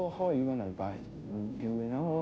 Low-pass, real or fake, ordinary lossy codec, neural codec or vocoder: none; fake; none; codec, 16 kHz, 0.5 kbps, FunCodec, trained on Chinese and English, 25 frames a second